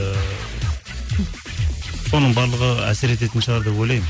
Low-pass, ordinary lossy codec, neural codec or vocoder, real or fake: none; none; none; real